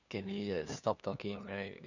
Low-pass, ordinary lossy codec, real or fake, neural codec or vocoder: 7.2 kHz; none; fake; codec, 16 kHz, 2 kbps, FunCodec, trained on LibriTTS, 25 frames a second